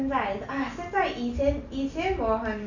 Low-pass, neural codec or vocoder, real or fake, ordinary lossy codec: 7.2 kHz; none; real; none